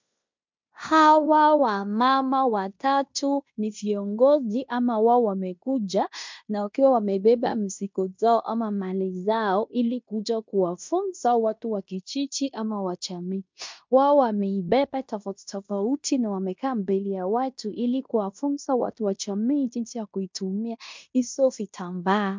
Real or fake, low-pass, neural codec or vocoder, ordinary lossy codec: fake; 7.2 kHz; codec, 16 kHz in and 24 kHz out, 0.9 kbps, LongCat-Audio-Codec, fine tuned four codebook decoder; MP3, 64 kbps